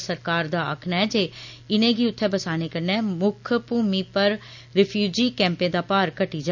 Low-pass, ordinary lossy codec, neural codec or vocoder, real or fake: 7.2 kHz; none; none; real